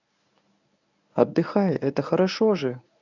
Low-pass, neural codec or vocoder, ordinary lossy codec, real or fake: 7.2 kHz; codec, 24 kHz, 0.9 kbps, WavTokenizer, medium speech release version 1; none; fake